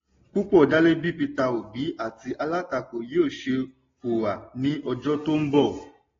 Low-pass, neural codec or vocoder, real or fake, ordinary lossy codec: 7.2 kHz; none; real; AAC, 24 kbps